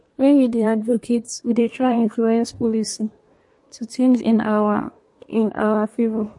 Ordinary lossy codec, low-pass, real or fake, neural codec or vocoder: MP3, 48 kbps; 10.8 kHz; fake; codec, 24 kHz, 1 kbps, SNAC